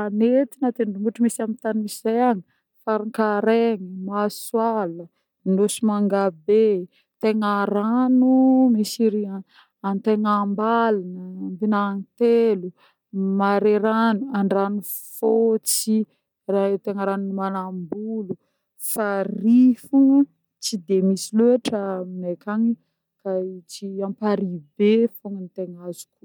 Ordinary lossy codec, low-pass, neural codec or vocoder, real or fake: none; 19.8 kHz; none; real